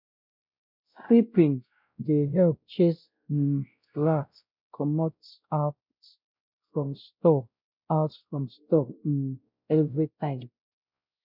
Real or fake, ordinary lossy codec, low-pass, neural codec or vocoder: fake; none; 5.4 kHz; codec, 16 kHz, 0.5 kbps, X-Codec, WavLM features, trained on Multilingual LibriSpeech